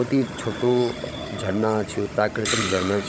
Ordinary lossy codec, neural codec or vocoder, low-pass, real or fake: none; codec, 16 kHz, 16 kbps, FunCodec, trained on Chinese and English, 50 frames a second; none; fake